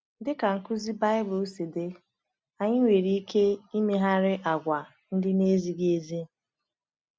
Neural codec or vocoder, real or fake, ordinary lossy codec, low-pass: none; real; none; none